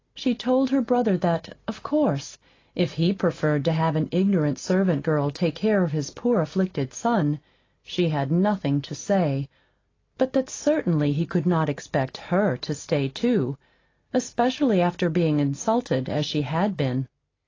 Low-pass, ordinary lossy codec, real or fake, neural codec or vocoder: 7.2 kHz; AAC, 32 kbps; real; none